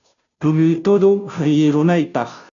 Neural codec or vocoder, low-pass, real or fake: codec, 16 kHz, 0.5 kbps, FunCodec, trained on Chinese and English, 25 frames a second; 7.2 kHz; fake